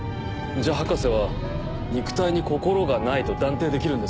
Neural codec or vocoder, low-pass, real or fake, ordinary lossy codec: none; none; real; none